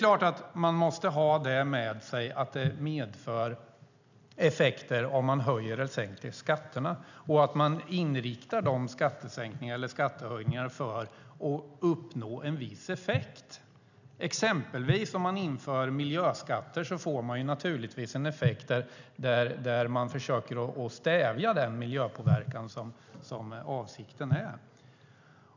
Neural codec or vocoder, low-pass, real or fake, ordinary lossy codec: none; 7.2 kHz; real; none